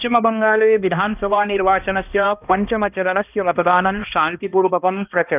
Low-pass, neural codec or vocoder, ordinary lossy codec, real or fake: 3.6 kHz; codec, 16 kHz, 1 kbps, X-Codec, HuBERT features, trained on balanced general audio; none; fake